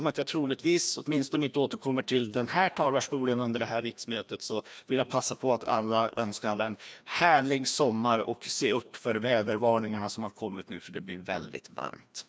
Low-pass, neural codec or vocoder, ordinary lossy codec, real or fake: none; codec, 16 kHz, 1 kbps, FreqCodec, larger model; none; fake